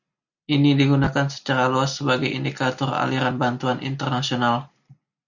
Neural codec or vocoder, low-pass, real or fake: none; 7.2 kHz; real